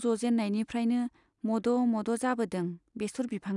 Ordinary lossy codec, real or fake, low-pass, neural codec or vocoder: none; real; 10.8 kHz; none